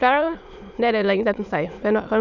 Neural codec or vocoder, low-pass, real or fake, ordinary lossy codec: autoencoder, 22.05 kHz, a latent of 192 numbers a frame, VITS, trained on many speakers; 7.2 kHz; fake; none